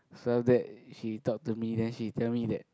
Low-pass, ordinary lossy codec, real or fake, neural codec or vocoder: none; none; real; none